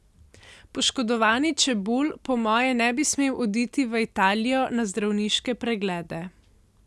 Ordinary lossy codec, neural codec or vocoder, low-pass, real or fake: none; none; none; real